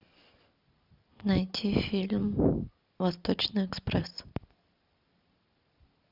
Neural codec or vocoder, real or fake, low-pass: none; real; 5.4 kHz